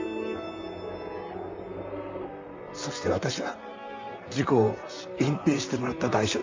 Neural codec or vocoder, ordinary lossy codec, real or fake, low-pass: vocoder, 44.1 kHz, 128 mel bands, Pupu-Vocoder; MP3, 64 kbps; fake; 7.2 kHz